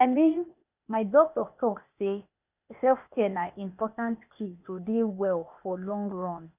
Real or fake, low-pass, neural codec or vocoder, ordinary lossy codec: fake; 3.6 kHz; codec, 16 kHz, 0.8 kbps, ZipCodec; none